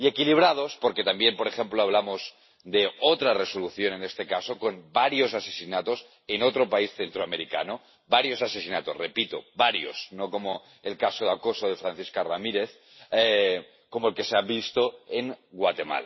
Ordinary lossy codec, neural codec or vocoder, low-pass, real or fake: MP3, 24 kbps; none; 7.2 kHz; real